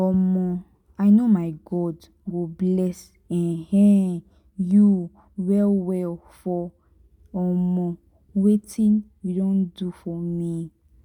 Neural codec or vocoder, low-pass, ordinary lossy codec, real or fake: none; 19.8 kHz; none; real